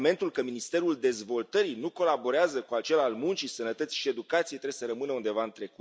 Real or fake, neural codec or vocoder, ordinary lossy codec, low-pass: real; none; none; none